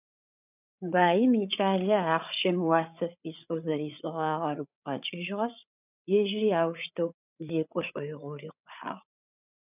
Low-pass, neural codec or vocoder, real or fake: 3.6 kHz; codec, 16 kHz, 8 kbps, FreqCodec, larger model; fake